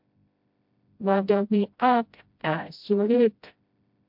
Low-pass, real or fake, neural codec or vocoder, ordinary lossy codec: 5.4 kHz; fake; codec, 16 kHz, 0.5 kbps, FreqCodec, smaller model; MP3, 48 kbps